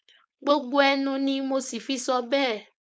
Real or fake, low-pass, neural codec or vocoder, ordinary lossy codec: fake; none; codec, 16 kHz, 4.8 kbps, FACodec; none